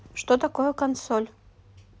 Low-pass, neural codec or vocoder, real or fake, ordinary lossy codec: none; none; real; none